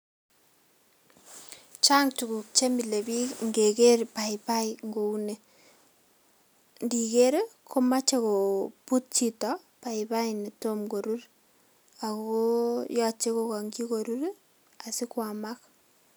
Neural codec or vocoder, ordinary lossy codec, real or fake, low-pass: none; none; real; none